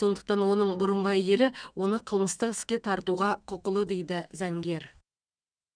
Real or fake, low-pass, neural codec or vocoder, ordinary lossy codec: fake; 9.9 kHz; codec, 32 kHz, 1.9 kbps, SNAC; none